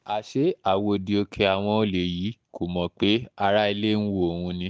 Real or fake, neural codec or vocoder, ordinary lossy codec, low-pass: real; none; none; none